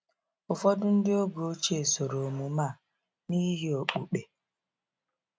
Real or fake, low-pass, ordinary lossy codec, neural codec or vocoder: real; none; none; none